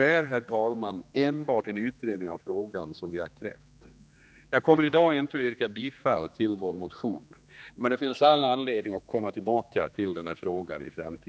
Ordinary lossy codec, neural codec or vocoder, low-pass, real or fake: none; codec, 16 kHz, 2 kbps, X-Codec, HuBERT features, trained on general audio; none; fake